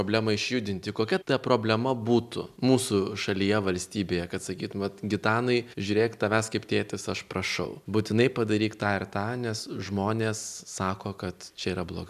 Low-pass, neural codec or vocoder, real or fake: 14.4 kHz; none; real